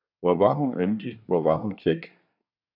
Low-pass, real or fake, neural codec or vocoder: 5.4 kHz; fake; codec, 24 kHz, 1 kbps, SNAC